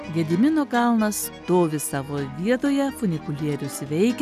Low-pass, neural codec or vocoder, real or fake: 14.4 kHz; none; real